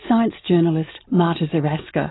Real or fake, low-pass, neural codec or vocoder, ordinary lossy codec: real; 7.2 kHz; none; AAC, 16 kbps